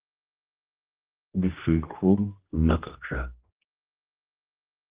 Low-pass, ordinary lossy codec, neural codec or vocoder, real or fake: 3.6 kHz; Opus, 16 kbps; codec, 16 kHz, 1 kbps, X-Codec, HuBERT features, trained on general audio; fake